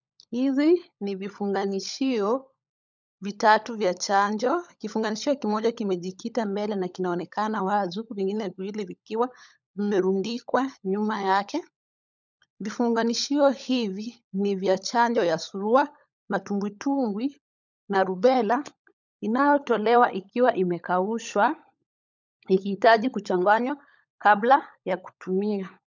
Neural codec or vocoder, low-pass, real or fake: codec, 16 kHz, 16 kbps, FunCodec, trained on LibriTTS, 50 frames a second; 7.2 kHz; fake